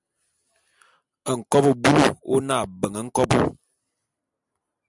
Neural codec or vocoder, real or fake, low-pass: none; real; 10.8 kHz